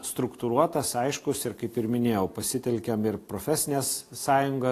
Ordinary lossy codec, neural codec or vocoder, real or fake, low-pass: AAC, 48 kbps; none; real; 14.4 kHz